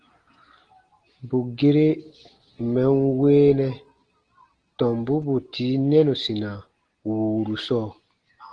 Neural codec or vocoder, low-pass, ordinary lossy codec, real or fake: none; 9.9 kHz; Opus, 16 kbps; real